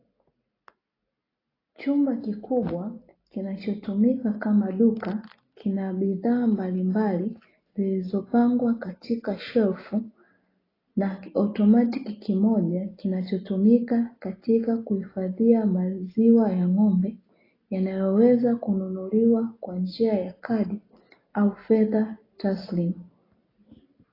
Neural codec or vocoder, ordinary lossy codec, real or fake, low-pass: none; AAC, 24 kbps; real; 5.4 kHz